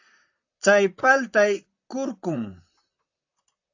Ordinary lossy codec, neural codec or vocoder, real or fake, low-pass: AAC, 48 kbps; vocoder, 44.1 kHz, 128 mel bands, Pupu-Vocoder; fake; 7.2 kHz